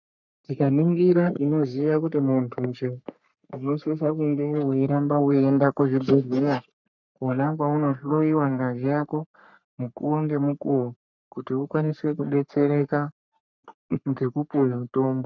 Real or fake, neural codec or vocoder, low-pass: fake; codec, 44.1 kHz, 3.4 kbps, Pupu-Codec; 7.2 kHz